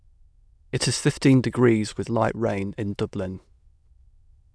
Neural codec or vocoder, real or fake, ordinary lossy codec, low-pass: autoencoder, 22.05 kHz, a latent of 192 numbers a frame, VITS, trained on many speakers; fake; none; none